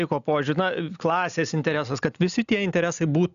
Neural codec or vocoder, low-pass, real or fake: none; 7.2 kHz; real